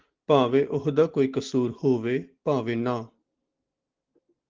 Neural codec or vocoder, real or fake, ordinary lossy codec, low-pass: none; real; Opus, 16 kbps; 7.2 kHz